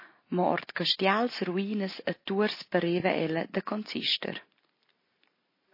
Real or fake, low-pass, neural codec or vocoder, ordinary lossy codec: real; 5.4 kHz; none; MP3, 24 kbps